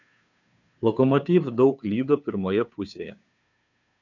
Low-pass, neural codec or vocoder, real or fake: 7.2 kHz; codec, 16 kHz, 2 kbps, FunCodec, trained on Chinese and English, 25 frames a second; fake